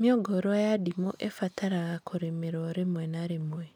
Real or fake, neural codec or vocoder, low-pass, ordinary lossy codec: real; none; 19.8 kHz; none